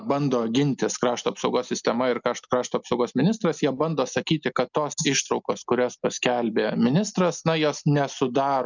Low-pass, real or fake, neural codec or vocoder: 7.2 kHz; real; none